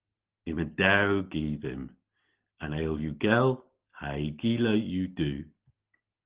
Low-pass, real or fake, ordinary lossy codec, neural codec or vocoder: 3.6 kHz; real; Opus, 16 kbps; none